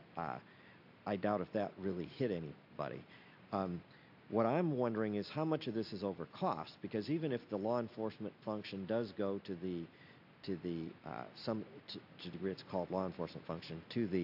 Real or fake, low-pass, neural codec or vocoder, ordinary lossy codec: real; 5.4 kHz; none; AAC, 48 kbps